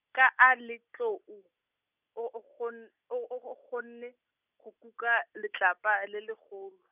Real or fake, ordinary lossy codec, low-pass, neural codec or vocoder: real; none; 3.6 kHz; none